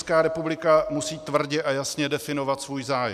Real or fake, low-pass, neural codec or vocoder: real; 14.4 kHz; none